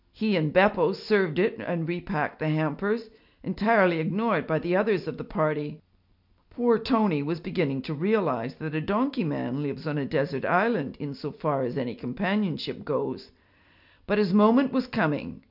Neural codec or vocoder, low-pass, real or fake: none; 5.4 kHz; real